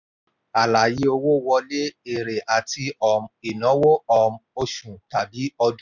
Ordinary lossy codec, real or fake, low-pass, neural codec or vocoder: none; real; 7.2 kHz; none